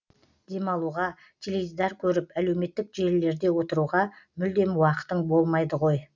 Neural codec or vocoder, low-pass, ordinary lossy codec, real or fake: none; 7.2 kHz; none; real